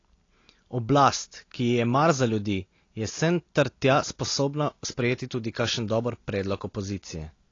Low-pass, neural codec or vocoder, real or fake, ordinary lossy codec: 7.2 kHz; none; real; AAC, 32 kbps